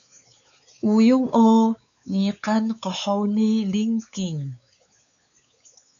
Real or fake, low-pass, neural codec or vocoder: fake; 7.2 kHz; codec, 16 kHz, 4 kbps, X-Codec, WavLM features, trained on Multilingual LibriSpeech